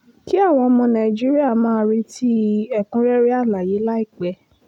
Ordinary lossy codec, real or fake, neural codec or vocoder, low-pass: none; real; none; 19.8 kHz